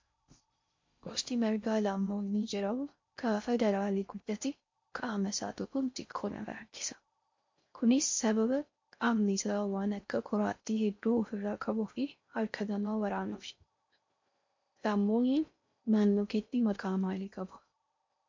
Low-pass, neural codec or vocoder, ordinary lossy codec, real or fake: 7.2 kHz; codec, 16 kHz in and 24 kHz out, 0.6 kbps, FocalCodec, streaming, 4096 codes; MP3, 48 kbps; fake